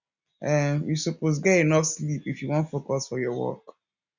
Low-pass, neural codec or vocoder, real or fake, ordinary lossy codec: 7.2 kHz; none; real; none